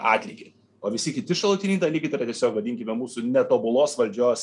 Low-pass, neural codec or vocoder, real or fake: 10.8 kHz; none; real